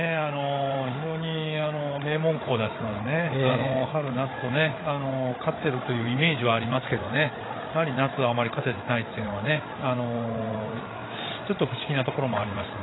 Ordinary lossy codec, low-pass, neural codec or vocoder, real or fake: AAC, 16 kbps; 7.2 kHz; codec, 16 kHz, 8 kbps, FreqCodec, larger model; fake